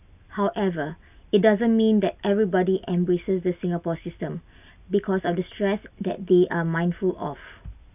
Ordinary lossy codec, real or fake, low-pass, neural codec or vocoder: none; real; 3.6 kHz; none